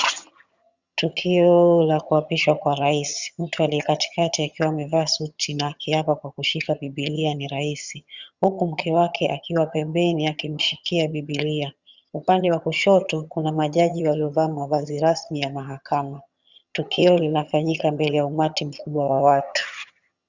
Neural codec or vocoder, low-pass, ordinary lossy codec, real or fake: vocoder, 22.05 kHz, 80 mel bands, HiFi-GAN; 7.2 kHz; Opus, 64 kbps; fake